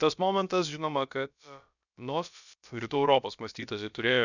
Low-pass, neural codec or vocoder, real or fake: 7.2 kHz; codec, 16 kHz, about 1 kbps, DyCAST, with the encoder's durations; fake